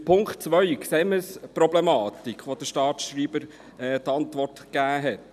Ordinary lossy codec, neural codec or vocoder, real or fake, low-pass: none; none; real; 14.4 kHz